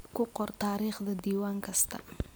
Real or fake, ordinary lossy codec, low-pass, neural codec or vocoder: real; none; none; none